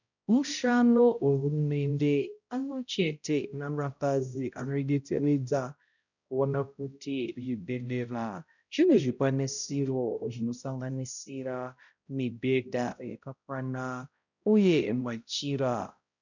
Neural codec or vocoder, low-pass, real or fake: codec, 16 kHz, 0.5 kbps, X-Codec, HuBERT features, trained on balanced general audio; 7.2 kHz; fake